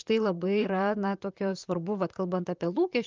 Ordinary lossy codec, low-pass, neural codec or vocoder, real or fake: Opus, 32 kbps; 7.2 kHz; vocoder, 44.1 kHz, 128 mel bands, Pupu-Vocoder; fake